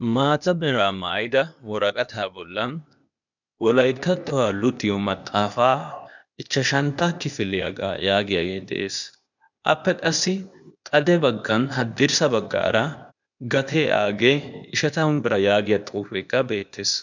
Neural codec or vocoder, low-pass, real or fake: codec, 16 kHz, 0.8 kbps, ZipCodec; 7.2 kHz; fake